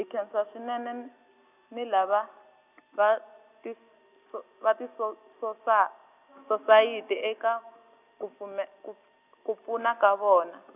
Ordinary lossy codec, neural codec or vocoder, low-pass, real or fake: none; none; 3.6 kHz; real